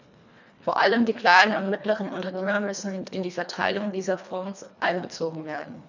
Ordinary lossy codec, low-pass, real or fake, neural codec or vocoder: none; 7.2 kHz; fake; codec, 24 kHz, 1.5 kbps, HILCodec